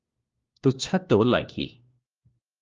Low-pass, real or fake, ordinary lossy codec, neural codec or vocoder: 7.2 kHz; fake; Opus, 32 kbps; codec, 16 kHz, 1 kbps, FunCodec, trained on LibriTTS, 50 frames a second